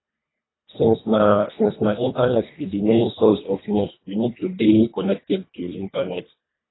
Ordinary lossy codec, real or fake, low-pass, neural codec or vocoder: AAC, 16 kbps; fake; 7.2 kHz; codec, 24 kHz, 1.5 kbps, HILCodec